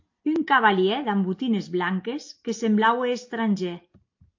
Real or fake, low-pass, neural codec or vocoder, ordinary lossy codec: real; 7.2 kHz; none; AAC, 48 kbps